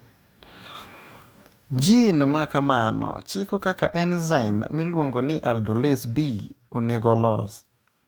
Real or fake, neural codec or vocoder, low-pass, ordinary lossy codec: fake; codec, 44.1 kHz, 2.6 kbps, DAC; none; none